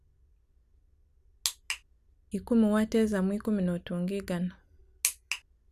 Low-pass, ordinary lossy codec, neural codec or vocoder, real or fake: 14.4 kHz; none; none; real